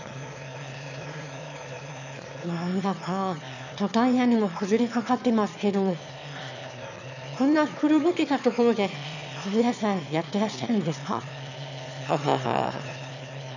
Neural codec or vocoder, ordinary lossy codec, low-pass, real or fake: autoencoder, 22.05 kHz, a latent of 192 numbers a frame, VITS, trained on one speaker; none; 7.2 kHz; fake